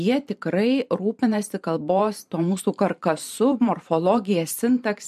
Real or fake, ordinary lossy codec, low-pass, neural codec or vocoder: fake; MP3, 64 kbps; 14.4 kHz; vocoder, 44.1 kHz, 128 mel bands every 512 samples, BigVGAN v2